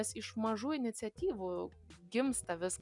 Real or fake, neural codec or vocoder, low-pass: real; none; 10.8 kHz